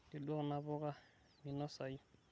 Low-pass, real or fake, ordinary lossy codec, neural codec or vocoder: none; real; none; none